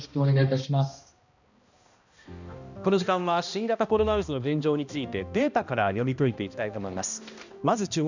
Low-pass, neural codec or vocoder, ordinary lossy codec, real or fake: 7.2 kHz; codec, 16 kHz, 1 kbps, X-Codec, HuBERT features, trained on balanced general audio; none; fake